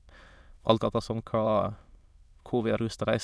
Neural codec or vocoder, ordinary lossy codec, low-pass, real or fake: autoencoder, 22.05 kHz, a latent of 192 numbers a frame, VITS, trained on many speakers; none; none; fake